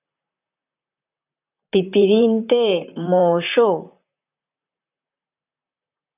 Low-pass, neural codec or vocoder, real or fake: 3.6 kHz; vocoder, 44.1 kHz, 128 mel bands, Pupu-Vocoder; fake